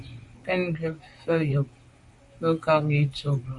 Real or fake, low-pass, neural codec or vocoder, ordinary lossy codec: fake; 10.8 kHz; vocoder, 44.1 kHz, 128 mel bands, Pupu-Vocoder; MP3, 64 kbps